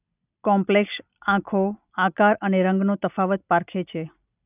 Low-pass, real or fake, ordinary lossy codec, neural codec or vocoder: 3.6 kHz; real; none; none